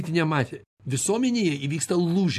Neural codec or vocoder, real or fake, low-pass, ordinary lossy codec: none; real; 14.4 kHz; AAC, 96 kbps